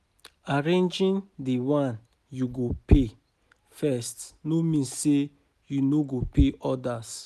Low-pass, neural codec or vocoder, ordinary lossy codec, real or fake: 14.4 kHz; none; none; real